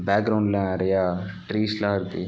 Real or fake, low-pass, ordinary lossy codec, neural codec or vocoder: real; none; none; none